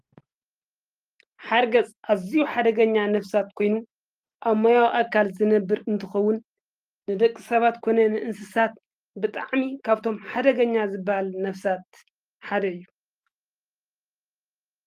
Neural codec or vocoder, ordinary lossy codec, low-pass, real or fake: none; Opus, 32 kbps; 14.4 kHz; real